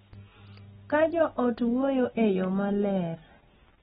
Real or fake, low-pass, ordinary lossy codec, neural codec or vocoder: real; 19.8 kHz; AAC, 16 kbps; none